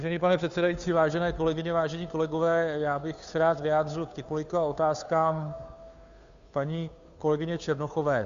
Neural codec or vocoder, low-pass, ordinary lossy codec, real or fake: codec, 16 kHz, 2 kbps, FunCodec, trained on Chinese and English, 25 frames a second; 7.2 kHz; Opus, 64 kbps; fake